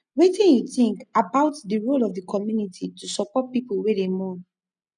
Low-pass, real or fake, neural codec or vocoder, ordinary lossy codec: 9.9 kHz; real; none; none